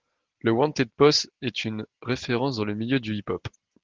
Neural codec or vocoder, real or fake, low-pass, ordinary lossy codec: none; real; 7.2 kHz; Opus, 16 kbps